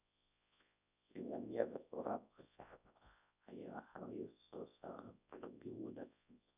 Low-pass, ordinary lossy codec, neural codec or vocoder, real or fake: 3.6 kHz; none; codec, 24 kHz, 0.9 kbps, WavTokenizer, large speech release; fake